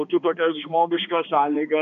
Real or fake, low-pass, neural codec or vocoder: fake; 7.2 kHz; codec, 16 kHz, 2 kbps, X-Codec, HuBERT features, trained on balanced general audio